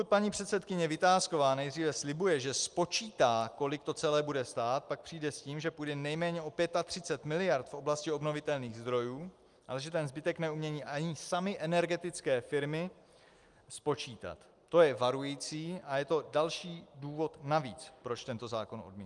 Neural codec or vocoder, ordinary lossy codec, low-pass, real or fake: none; Opus, 32 kbps; 10.8 kHz; real